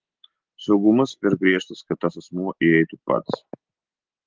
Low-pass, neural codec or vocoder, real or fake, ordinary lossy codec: 7.2 kHz; none; real; Opus, 16 kbps